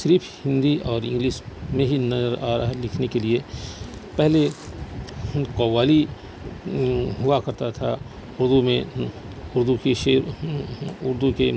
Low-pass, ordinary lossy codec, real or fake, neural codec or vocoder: none; none; real; none